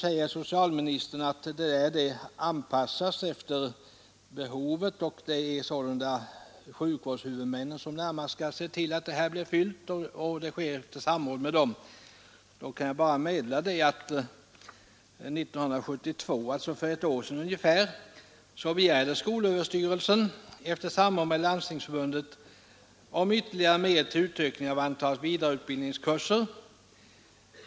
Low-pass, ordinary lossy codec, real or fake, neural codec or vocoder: none; none; real; none